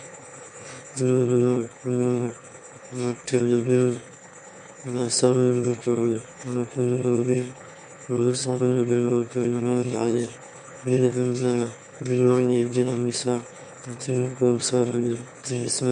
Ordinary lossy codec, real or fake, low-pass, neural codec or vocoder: MP3, 64 kbps; fake; 9.9 kHz; autoencoder, 22.05 kHz, a latent of 192 numbers a frame, VITS, trained on one speaker